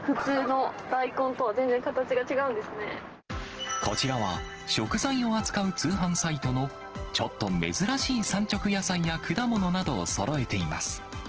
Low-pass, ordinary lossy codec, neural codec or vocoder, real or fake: 7.2 kHz; Opus, 16 kbps; none; real